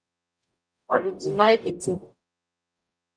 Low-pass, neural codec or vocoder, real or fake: 9.9 kHz; codec, 44.1 kHz, 0.9 kbps, DAC; fake